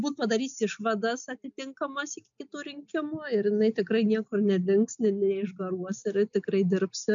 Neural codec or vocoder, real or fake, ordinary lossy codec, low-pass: none; real; MP3, 64 kbps; 7.2 kHz